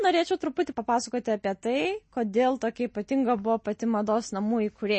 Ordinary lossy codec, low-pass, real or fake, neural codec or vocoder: MP3, 32 kbps; 9.9 kHz; real; none